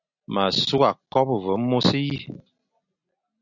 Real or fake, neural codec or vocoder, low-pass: real; none; 7.2 kHz